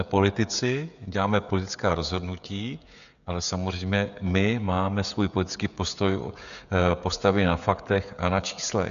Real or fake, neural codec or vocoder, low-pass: fake; codec, 16 kHz, 16 kbps, FreqCodec, smaller model; 7.2 kHz